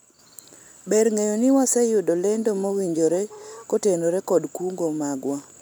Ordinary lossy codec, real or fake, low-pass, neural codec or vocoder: none; real; none; none